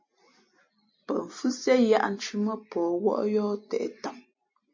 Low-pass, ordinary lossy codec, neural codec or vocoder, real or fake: 7.2 kHz; MP3, 32 kbps; none; real